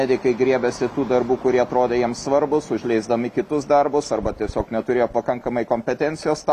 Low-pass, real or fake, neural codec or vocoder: 14.4 kHz; real; none